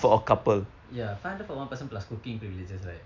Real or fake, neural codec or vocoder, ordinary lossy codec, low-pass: real; none; none; 7.2 kHz